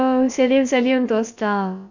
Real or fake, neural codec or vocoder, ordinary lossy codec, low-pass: fake; codec, 16 kHz, about 1 kbps, DyCAST, with the encoder's durations; none; 7.2 kHz